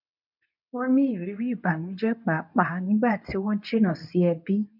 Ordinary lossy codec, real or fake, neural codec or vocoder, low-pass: none; fake; codec, 24 kHz, 0.9 kbps, WavTokenizer, medium speech release version 2; 5.4 kHz